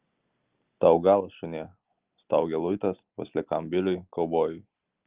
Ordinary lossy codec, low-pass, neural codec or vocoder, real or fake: Opus, 24 kbps; 3.6 kHz; none; real